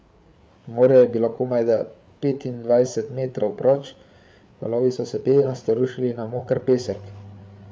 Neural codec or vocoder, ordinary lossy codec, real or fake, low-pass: codec, 16 kHz, 8 kbps, FreqCodec, larger model; none; fake; none